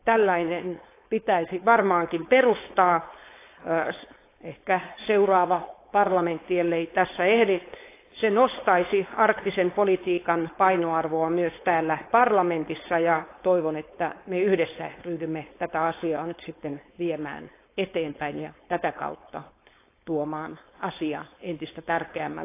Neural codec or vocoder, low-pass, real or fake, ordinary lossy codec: codec, 16 kHz, 4.8 kbps, FACodec; 3.6 kHz; fake; AAC, 24 kbps